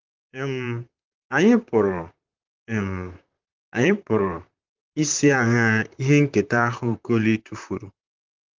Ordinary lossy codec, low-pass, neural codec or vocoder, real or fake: Opus, 32 kbps; 7.2 kHz; vocoder, 44.1 kHz, 128 mel bands, Pupu-Vocoder; fake